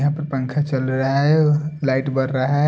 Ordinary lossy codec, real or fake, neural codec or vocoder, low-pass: none; real; none; none